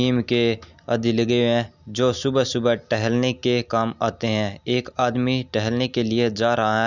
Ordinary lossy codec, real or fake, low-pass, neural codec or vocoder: none; real; 7.2 kHz; none